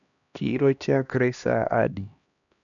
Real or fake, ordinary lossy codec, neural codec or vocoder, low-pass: fake; none; codec, 16 kHz, 1 kbps, X-Codec, HuBERT features, trained on LibriSpeech; 7.2 kHz